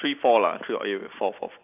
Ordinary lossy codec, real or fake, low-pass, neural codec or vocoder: none; real; 3.6 kHz; none